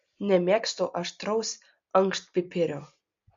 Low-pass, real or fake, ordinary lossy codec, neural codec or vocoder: 7.2 kHz; real; MP3, 48 kbps; none